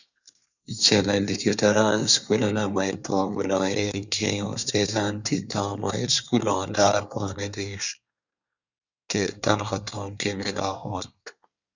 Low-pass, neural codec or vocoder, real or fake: 7.2 kHz; codec, 24 kHz, 1 kbps, SNAC; fake